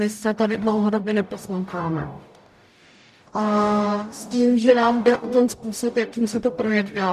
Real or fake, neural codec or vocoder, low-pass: fake; codec, 44.1 kHz, 0.9 kbps, DAC; 14.4 kHz